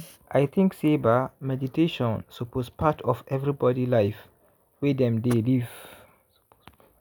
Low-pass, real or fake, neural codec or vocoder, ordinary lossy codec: none; real; none; none